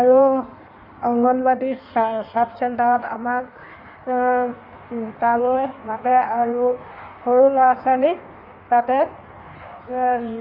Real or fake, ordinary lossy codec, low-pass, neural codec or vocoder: fake; none; 5.4 kHz; codec, 16 kHz in and 24 kHz out, 1.1 kbps, FireRedTTS-2 codec